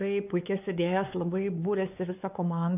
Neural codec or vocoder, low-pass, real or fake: codec, 16 kHz, 4 kbps, X-Codec, WavLM features, trained on Multilingual LibriSpeech; 3.6 kHz; fake